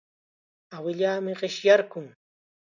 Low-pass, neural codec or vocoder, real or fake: 7.2 kHz; none; real